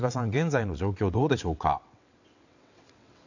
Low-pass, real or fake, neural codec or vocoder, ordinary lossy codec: 7.2 kHz; fake; vocoder, 22.05 kHz, 80 mel bands, WaveNeXt; none